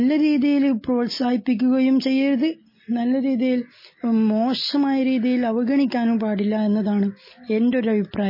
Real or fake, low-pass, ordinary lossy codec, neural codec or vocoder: real; 5.4 kHz; MP3, 24 kbps; none